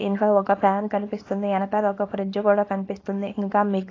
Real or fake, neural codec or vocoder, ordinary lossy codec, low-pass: fake; codec, 24 kHz, 0.9 kbps, WavTokenizer, small release; AAC, 32 kbps; 7.2 kHz